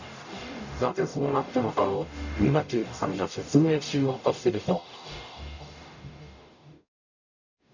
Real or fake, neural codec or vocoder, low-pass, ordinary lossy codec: fake; codec, 44.1 kHz, 0.9 kbps, DAC; 7.2 kHz; none